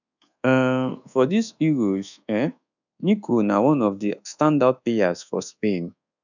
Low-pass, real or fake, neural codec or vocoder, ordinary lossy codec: 7.2 kHz; fake; codec, 24 kHz, 1.2 kbps, DualCodec; none